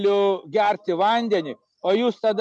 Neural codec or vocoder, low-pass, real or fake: none; 9.9 kHz; real